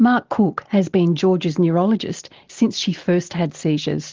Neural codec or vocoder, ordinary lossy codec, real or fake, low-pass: none; Opus, 16 kbps; real; 7.2 kHz